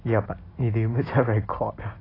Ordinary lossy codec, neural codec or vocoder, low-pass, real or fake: AAC, 24 kbps; none; 5.4 kHz; real